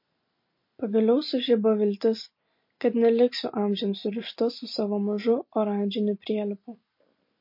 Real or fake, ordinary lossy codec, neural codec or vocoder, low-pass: real; MP3, 32 kbps; none; 5.4 kHz